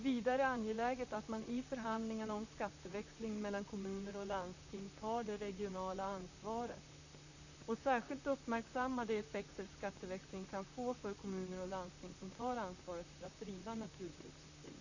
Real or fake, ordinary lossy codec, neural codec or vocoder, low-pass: fake; none; vocoder, 44.1 kHz, 128 mel bands, Pupu-Vocoder; 7.2 kHz